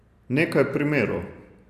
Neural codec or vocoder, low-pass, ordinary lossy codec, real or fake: none; 14.4 kHz; Opus, 64 kbps; real